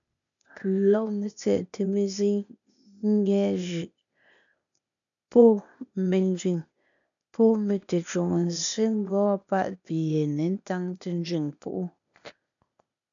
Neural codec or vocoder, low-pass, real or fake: codec, 16 kHz, 0.8 kbps, ZipCodec; 7.2 kHz; fake